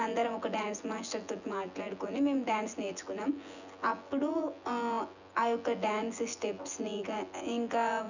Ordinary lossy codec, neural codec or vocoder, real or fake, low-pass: none; vocoder, 24 kHz, 100 mel bands, Vocos; fake; 7.2 kHz